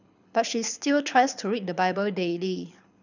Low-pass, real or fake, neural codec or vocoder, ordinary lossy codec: 7.2 kHz; fake; codec, 24 kHz, 6 kbps, HILCodec; none